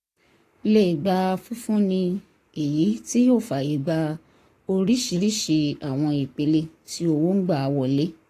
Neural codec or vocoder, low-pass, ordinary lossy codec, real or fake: codec, 44.1 kHz, 7.8 kbps, Pupu-Codec; 14.4 kHz; AAC, 48 kbps; fake